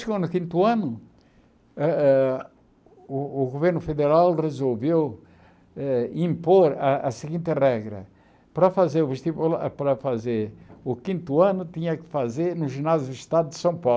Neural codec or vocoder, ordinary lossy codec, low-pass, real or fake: none; none; none; real